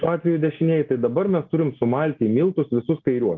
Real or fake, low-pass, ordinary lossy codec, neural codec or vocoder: real; 7.2 kHz; Opus, 24 kbps; none